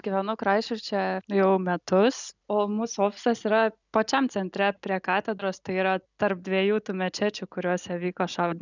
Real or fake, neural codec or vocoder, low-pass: real; none; 7.2 kHz